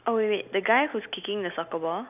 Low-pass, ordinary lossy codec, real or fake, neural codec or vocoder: 3.6 kHz; none; real; none